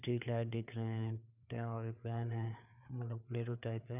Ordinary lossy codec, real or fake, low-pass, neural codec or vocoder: none; fake; 3.6 kHz; codec, 16 kHz, 4 kbps, FreqCodec, larger model